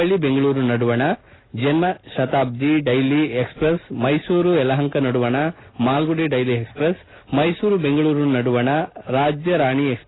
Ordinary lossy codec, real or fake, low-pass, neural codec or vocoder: AAC, 16 kbps; real; 7.2 kHz; none